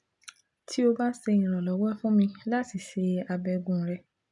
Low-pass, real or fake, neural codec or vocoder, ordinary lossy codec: 10.8 kHz; real; none; none